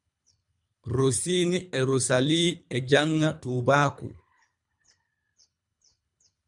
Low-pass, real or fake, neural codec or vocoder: 10.8 kHz; fake; codec, 24 kHz, 3 kbps, HILCodec